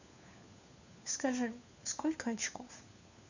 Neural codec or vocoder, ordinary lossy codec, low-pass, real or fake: codec, 16 kHz, 4 kbps, FunCodec, trained on LibriTTS, 50 frames a second; none; 7.2 kHz; fake